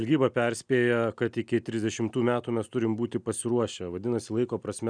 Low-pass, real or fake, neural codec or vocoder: 9.9 kHz; real; none